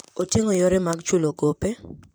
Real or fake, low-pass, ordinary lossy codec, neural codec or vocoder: fake; none; none; vocoder, 44.1 kHz, 128 mel bands, Pupu-Vocoder